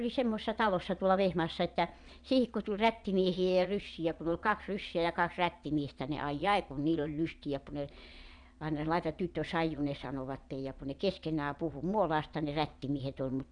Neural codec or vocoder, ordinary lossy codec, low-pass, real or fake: vocoder, 22.05 kHz, 80 mel bands, Vocos; none; 9.9 kHz; fake